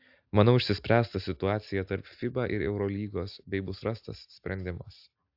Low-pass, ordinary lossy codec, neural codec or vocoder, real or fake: 5.4 kHz; AAC, 48 kbps; none; real